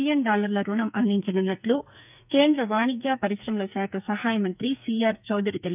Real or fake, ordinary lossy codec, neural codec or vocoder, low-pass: fake; none; codec, 44.1 kHz, 2.6 kbps, SNAC; 3.6 kHz